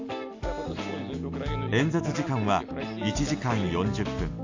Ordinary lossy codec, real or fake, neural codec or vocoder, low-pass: AAC, 48 kbps; real; none; 7.2 kHz